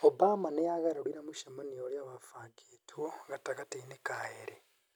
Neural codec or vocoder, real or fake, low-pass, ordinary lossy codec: none; real; none; none